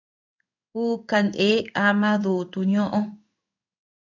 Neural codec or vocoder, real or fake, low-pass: codec, 16 kHz in and 24 kHz out, 1 kbps, XY-Tokenizer; fake; 7.2 kHz